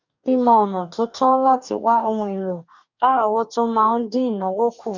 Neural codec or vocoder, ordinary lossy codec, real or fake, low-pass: codec, 44.1 kHz, 2.6 kbps, DAC; none; fake; 7.2 kHz